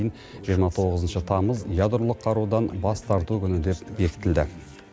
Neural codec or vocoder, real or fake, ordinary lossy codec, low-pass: none; real; none; none